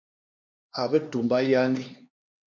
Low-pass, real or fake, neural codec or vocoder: 7.2 kHz; fake; codec, 16 kHz, 2 kbps, X-Codec, HuBERT features, trained on LibriSpeech